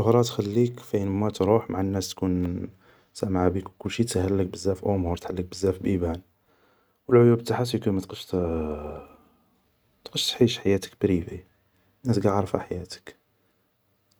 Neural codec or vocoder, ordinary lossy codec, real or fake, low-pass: none; none; real; none